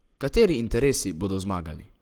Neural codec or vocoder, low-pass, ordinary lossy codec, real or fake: codec, 44.1 kHz, 7.8 kbps, Pupu-Codec; 19.8 kHz; Opus, 24 kbps; fake